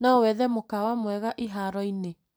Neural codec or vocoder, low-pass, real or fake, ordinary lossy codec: vocoder, 44.1 kHz, 128 mel bands every 256 samples, BigVGAN v2; none; fake; none